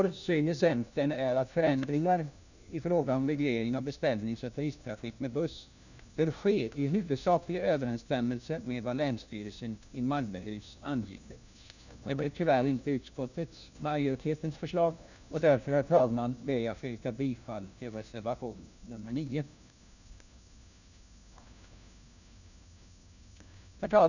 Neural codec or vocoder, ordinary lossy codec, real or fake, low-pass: codec, 16 kHz, 1 kbps, FunCodec, trained on LibriTTS, 50 frames a second; none; fake; 7.2 kHz